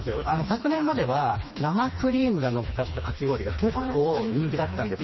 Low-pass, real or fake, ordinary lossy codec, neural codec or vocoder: 7.2 kHz; fake; MP3, 24 kbps; codec, 16 kHz, 2 kbps, FreqCodec, smaller model